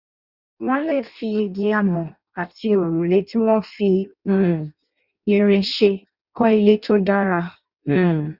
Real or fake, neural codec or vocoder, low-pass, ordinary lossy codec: fake; codec, 16 kHz in and 24 kHz out, 0.6 kbps, FireRedTTS-2 codec; 5.4 kHz; Opus, 64 kbps